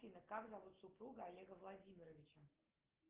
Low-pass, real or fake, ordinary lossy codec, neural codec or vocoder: 3.6 kHz; real; Opus, 16 kbps; none